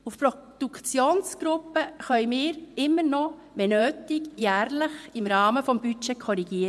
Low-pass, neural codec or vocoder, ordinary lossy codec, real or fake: none; vocoder, 24 kHz, 100 mel bands, Vocos; none; fake